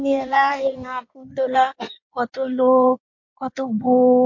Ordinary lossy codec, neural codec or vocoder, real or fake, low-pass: MP3, 48 kbps; codec, 16 kHz in and 24 kHz out, 1.1 kbps, FireRedTTS-2 codec; fake; 7.2 kHz